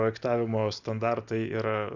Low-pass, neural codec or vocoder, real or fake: 7.2 kHz; none; real